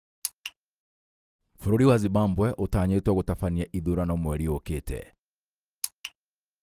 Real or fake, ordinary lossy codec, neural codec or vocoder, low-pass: real; Opus, 24 kbps; none; 14.4 kHz